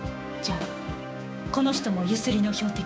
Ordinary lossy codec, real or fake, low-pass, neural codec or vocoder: none; fake; none; codec, 16 kHz, 6 kbps, DAC